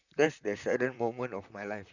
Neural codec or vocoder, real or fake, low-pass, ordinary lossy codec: vocoder, 44.1 kHz, 128 mel bands, Pupu-Vocoder; fake; 7.2 kHz; none